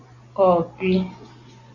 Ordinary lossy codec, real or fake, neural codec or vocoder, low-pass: Opus, 64 kbps; real; none; 7.2 kHz